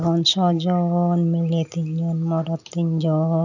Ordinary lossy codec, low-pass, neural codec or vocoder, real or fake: none; 7.2 kHz; none; real